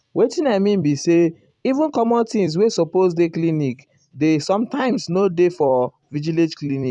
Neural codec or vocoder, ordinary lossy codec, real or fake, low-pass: vocoder, 44.1 kHz, 128 mel bands every 256 samples, BigVGAN v2; none; fake; 10.8 kHz